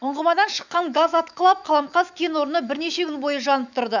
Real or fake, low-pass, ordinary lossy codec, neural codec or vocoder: fake; 7.2 kHz; none; autoencoder, 48 kHz, 128 numbers a frame, DAC-VAE, trained on Japanese speech